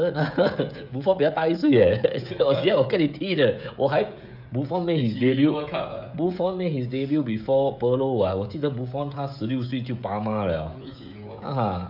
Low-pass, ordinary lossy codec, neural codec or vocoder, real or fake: 5.4 kHz; none; codec, 16 kHz, 16 kbps, FreqCodec, smaller model; fake